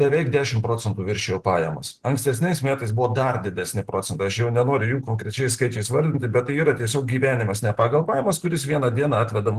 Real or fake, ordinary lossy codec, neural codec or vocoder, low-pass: real; Opus, 16 kbps; none; 14.4 kHz